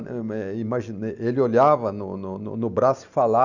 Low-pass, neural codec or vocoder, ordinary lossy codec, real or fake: 7.2 kHz; none; none; real